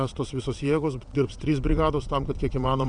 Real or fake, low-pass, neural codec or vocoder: fake; 9.9 kHz; vocoder, 22.05 kHz, 80 mel bands, WaveNeXt